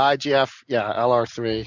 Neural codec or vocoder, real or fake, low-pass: none; real; 7.2 kHz